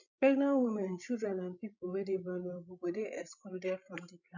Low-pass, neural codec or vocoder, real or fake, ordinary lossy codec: 7.2 kHz; codec, 16 kHz, 16 kbps, FreqCodec, larger model; fake; none